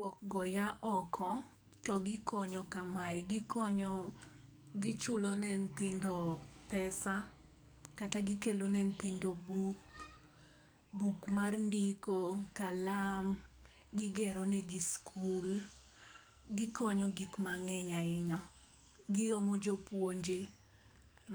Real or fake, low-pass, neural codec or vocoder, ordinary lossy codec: fake; none; codec, 44.1 kHz, 2.6 kbps, SNAC; none